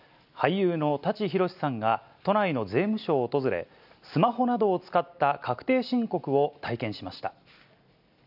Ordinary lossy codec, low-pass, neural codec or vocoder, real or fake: none; 5.4 kHz; none; real